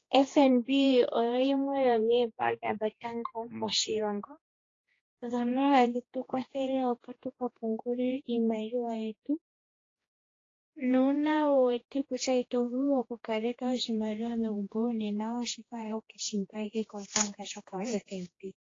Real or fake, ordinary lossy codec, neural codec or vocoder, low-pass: fake; AAC, 32 kbps; codec, 16 kHz, 2 kbps, X-Codec, HuBERT features, trained on general audio; 7.2 kHz